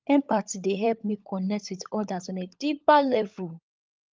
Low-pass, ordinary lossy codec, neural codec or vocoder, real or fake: 7.2 kHz; Opus, 24 kbps; codec, 16 kHz, 16 kbps, FunCodec, trained on LibriTTS, 50 frames a second; fake